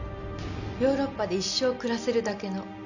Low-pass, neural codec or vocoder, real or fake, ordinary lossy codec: 7.2 kHz; none; real; none